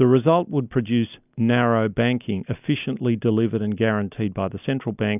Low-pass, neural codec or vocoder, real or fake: 3.6 kHz; none; real